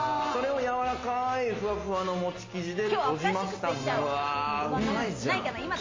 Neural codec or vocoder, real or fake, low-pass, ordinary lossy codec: none; real; 7.2 kHz; MP3, 32 kbps